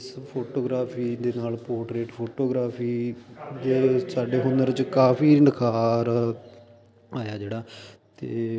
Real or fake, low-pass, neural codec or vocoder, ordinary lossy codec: real; none; none; none